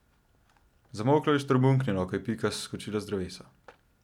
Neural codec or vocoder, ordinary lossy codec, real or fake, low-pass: none; none; real; 19.8 kHz